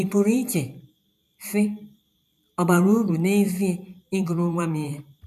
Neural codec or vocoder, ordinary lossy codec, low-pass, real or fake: vocoder, 44.1 kHz, 128 mel bands every 512 samples, BigVGAN v2; none; 14.4 kHz; fake